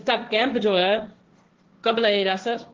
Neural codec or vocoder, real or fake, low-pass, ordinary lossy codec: codec, 16 kHz, 1.1 kbps, Voila-Tokenizer; fake; 7.2 kHz; Opus, 16 kbps